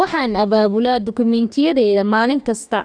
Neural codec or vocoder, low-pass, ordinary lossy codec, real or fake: codec, 44.1 kHz, 2.6 kbps, DAC; 9.9 kHz; none; fake